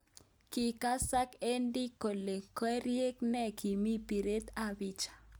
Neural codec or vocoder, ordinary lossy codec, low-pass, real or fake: none; none; none; real